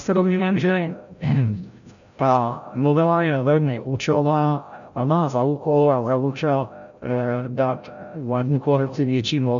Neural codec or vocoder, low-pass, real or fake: codec, 16 kHz, 0.5 kbps, FreqCodec, larger model; 7.2 kHz; fake